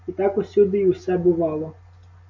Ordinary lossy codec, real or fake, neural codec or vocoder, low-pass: MP3, 48 kbps; real; none; 7.2 kHz